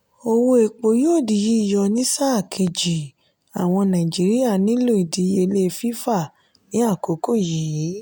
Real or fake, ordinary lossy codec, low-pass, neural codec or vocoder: real; none; none; none